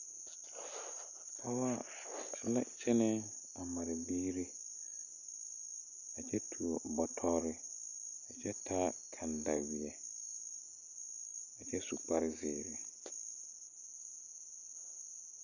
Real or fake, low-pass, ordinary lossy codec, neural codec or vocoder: real; 7.2 kHz; Opus, 64 kbps; none